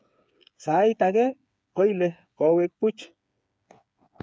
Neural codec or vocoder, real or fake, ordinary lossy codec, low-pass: codec, 16 kHz, 8 kbps, FreqCodec, smaller model; fake; none; none